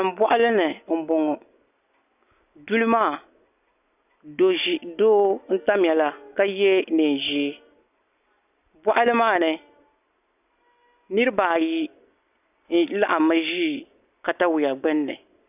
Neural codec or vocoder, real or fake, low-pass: none; real; 3.6 kHz